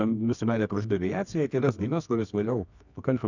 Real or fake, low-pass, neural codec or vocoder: fake; 7.2 kHz; codec, 24 kHz, 0.9 kbps, WavTokenizer, medium music audio release